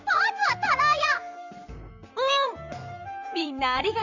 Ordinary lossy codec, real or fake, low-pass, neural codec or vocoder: none; fake; 7.2 kHz; vocoder, 44.1 kHz, 128 mel bands, Pupu-Vocoder